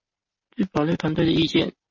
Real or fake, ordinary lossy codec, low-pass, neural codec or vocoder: real; MP3, 32 kbps; 7.2 kHz; none